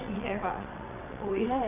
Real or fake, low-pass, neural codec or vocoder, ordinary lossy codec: fake; 3.6 kHz; vocoder, 22.05 kHz, 80 mel bands, WaveNeXt; none